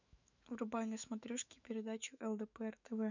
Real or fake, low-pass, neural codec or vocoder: fake; 7.2 kHz; autoencoder, 48 kHz, 128 numbers a frame, DAC-VAE, trained on Japanese speech